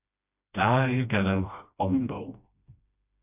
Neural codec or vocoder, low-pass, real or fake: codec, 16 kHz, 1 kbps, FreqCodec, smaller model; 3.6 kHz; fake